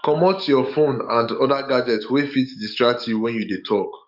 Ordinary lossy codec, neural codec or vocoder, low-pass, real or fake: none; codec, 44.1 kHz, 7.8 kbps, DAC; 5.4 kHz; fake